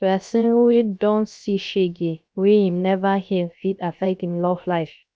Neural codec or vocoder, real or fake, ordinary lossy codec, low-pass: codec, 16 kHz, 0.7 kbps, FocalCodec; fake; none; none